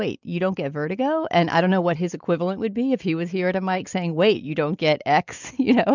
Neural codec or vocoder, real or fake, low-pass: none; real; 7.2 kHz